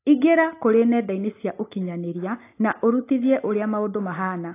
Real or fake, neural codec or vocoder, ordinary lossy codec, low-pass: real; none; AAC, 24 kbps; 3.6 kHz